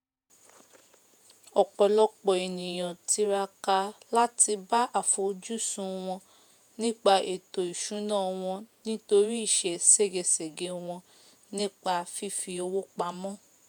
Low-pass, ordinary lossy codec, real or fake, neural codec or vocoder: none; none; fake; vocoder, 48 kHz, 128 mel bands, Vocos